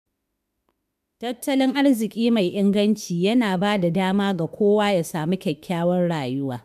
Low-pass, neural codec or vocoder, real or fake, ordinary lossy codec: 14.4 kHz; autoencoder, 48 kHz, 32 numbers a frame, DAC-VAE, trained on Japanese speech; fake; none